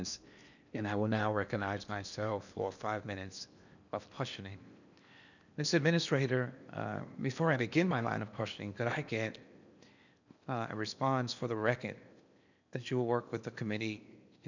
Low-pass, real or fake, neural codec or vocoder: 7.2 kHz; fake; codec, 16 kHz in and 24 kHz out, 0.8 kbps, FocalCodec, streaming, 65536 codes